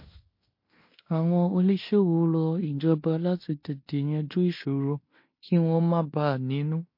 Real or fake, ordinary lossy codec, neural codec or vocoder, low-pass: fake; MP3, 32 kbps; codec, 16 kHz in and 24 kHz out, 0.9 kbps, LongCat-Audio-Codec, fine tuned four codebook decoder; 5.4 kHz